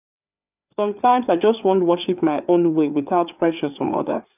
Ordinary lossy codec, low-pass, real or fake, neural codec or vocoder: none; 3.6 kHz; fake; codec, 16 kHz, 4 kbps, FreqCodec, larger model